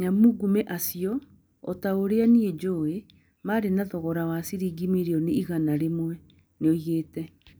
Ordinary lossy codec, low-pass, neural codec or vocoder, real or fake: none; none; none; real